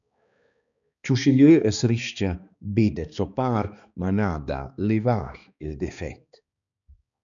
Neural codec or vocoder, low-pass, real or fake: codec, 16 kHz, 2 kbps, X-Codec, HuBERT features, trained on balanced general audio; 7.2 kHz; fake